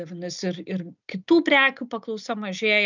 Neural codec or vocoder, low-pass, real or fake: none; 7.2 kHz; real